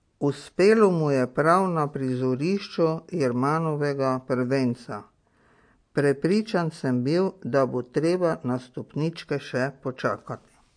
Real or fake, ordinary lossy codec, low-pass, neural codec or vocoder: real; MP3, 48 kbps; 9.9 kHz; none